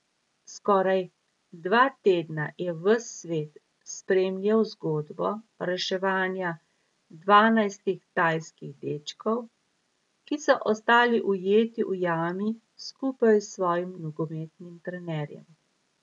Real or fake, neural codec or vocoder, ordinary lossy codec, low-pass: real; none; none; 10.8 kHz